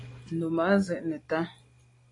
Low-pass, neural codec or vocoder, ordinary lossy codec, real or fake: 10.8 kHz; none; AAC, 32 kbps; real